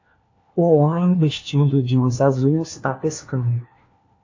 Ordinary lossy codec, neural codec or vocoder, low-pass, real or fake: AAC, 48 kbps; codec, 16 kHz, 1 kbps, FunCodec, trained on LibriTTS, 50 frames a second; 7.2 kHz; fake